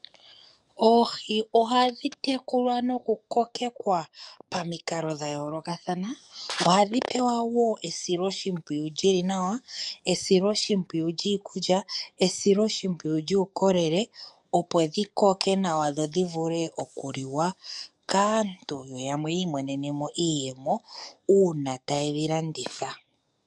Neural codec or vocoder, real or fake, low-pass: codec, 44.1 kHz, 7.8 kbps, DAC; fake; 10.8 kHz